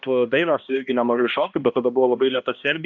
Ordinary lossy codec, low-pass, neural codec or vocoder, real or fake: MP3, 64 kbps; 7.2 kHz; codec, 16 kHz, 1 kbps, X-Codec, HuBERT features, trained on balanced general audio; fake